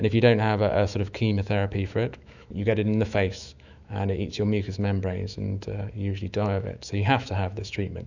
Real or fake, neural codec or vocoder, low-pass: fake; autoencoder, 48 kHz, 128 numbers a frame, DAC-VAE, trained on Japanese speech; 7.2 kHz